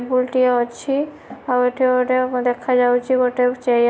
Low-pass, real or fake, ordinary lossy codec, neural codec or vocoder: none; real; none; none